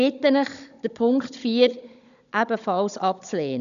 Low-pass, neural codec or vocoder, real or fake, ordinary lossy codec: 7.2 kHz; codec, 16 kHz, 16 kbps, FunCodec, trained on Chinese and English, 50 frames a second; fake; none